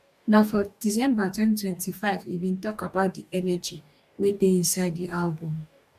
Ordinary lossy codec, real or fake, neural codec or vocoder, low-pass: AAC, 96 kbps; fake; codec, 44.1 kHz, 2.6 kbps, DAC; 14.4 kHz